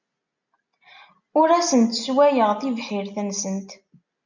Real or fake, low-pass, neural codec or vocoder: real; 7.2 kHz; none